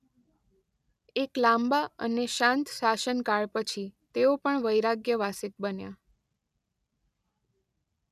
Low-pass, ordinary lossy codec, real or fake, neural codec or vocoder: 14.4 kHz; none; real; none